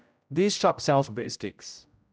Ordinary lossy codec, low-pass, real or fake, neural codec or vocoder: none; none; fake; codec, 16 kHz, 0.5 kbps, X-Codec, HuBERT features, trained on general audio